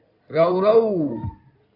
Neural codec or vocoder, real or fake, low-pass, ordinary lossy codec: codec, 16 kHz in and 24 kHz out, 2.2 kbps, FireRedTTS-2 codec; fake; 5.4 kHz; AAC, 48 kbps